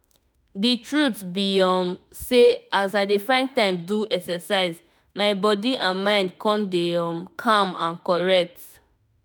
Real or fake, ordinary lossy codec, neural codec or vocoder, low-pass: fake; none; autoencoder, 48 kHz, 32 numbers a frame, DAC-VAE, trained on Japanese speech; none